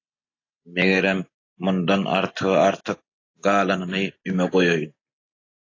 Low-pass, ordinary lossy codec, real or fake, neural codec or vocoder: 7.2 kHz; AAC, 32 kbps; real; none